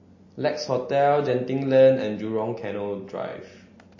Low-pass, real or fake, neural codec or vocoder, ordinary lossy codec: 7.2 kHz; real; none; MP3, 32 kbps